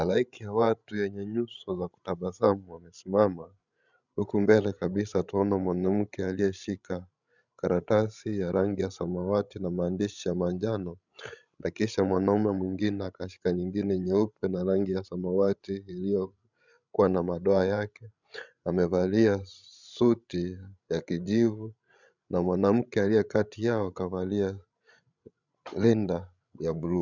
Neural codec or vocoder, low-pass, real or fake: codec, 16 kHz, 16 kbps, FreqCodec, larger model; 7.2 kHz; fake